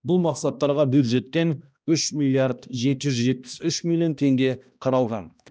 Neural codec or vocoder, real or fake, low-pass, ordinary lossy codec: codec, 16 kHz, 1 kbps, X-Codec, HuBERT features, trained on balanced general audio; fake; none; none